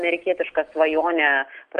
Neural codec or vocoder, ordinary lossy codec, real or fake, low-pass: none; Opus, 32 kbps; real; 10.8 kHz